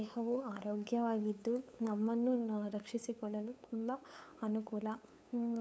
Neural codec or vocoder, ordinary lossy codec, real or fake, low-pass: codec, 16 kHz, 8 kbps, FunCodec, trained on LibriTTS, 25 frames a second; none; fake; none